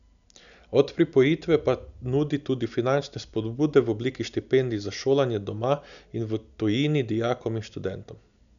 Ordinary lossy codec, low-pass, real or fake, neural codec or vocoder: none; 7.2 kHz; real; none